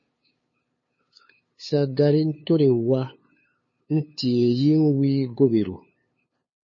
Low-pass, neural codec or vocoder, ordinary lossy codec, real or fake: 7.2 kHz; codec, 16 kHz, 2 kbps, FunCodec, trained on LibriTTS, 25 frames a second; MP3, 32 kbps; fake